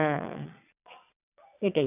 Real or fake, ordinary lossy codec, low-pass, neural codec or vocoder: fake; none; 3.6 kHz; vocoder, 22.05 kHz, 80 mel bands, Vocos